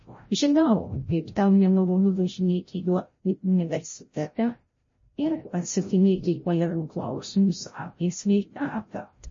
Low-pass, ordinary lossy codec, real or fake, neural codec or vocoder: 7.2 kHz; MP3, 32 kbps; fake; codec, 16 kHz, 0.5 kbps, FreqCodec, larger model